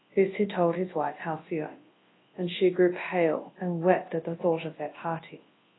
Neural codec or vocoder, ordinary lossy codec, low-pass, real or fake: codec, 24 kHz, 0.9 kbps, WavTokenizer, large speech release; AAC, 16 kbps; 7.2 kHz; fake